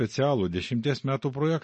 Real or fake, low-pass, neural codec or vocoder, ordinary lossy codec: real; 10.8 kHz; none; MP3, 32 kbps